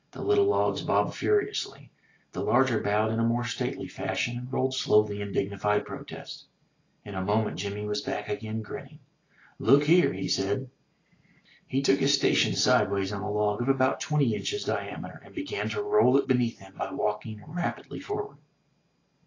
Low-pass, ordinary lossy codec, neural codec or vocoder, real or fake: 7.2 kHz; AAC, 32 kbps; none; real